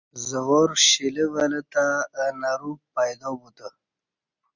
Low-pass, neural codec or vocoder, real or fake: 7.2 kHz; none; real